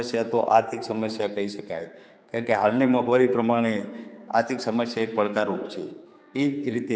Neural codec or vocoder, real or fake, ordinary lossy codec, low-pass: codec, 16 kHz, 4 kbps, X-Codec, HuBERT features, trained on general audio; fake; none; none